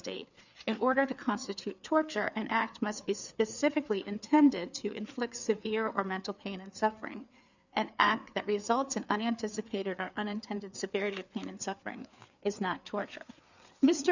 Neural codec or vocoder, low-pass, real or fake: codec, 16 kHz, 4 kbps, FreqCodec, larger model; 7.2 kHz; fake